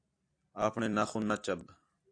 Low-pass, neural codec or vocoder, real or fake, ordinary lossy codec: 9.9 kHz; vocoder, 44.1 kHz, 128 mel bands every 256 samples, BigVGAN v2; fake; AAC, 48 kbps